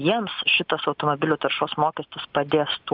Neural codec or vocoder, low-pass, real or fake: none; 5.4 kHz; real